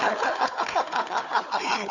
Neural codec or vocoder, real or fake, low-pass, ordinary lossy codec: codec, 16 kHz, 4 kbps, FunCodec, trained on Chinese and English, 50 frames a second; fake; 7.2 kHz; none